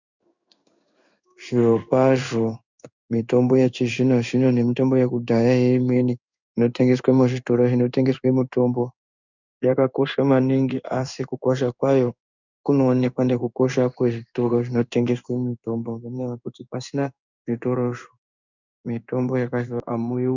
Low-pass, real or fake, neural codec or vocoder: 7.2 kHz; fake; codec, 16 kHz in and 24 kHz out, 1 kbps, XY-Tokenizer